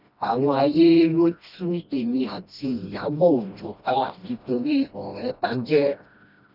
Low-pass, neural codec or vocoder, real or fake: 5.4 kHz; codec, 16 kHz, 1 kbps, FreqCodec, smaller model; fake